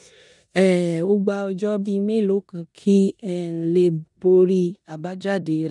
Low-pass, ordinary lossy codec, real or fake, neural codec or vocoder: 10.8 kHz; none; fake; codec, 16 kHz in and 24 kHz out, 0.9 kbps, LongCat-Audio-Codec, four codebook decoder